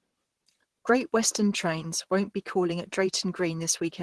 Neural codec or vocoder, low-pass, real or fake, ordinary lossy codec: vocoder, 44.1 kHz, 128 mel bands, Pupu-Vocoder; 10.8 kHz; fake; Opus, 16 kbps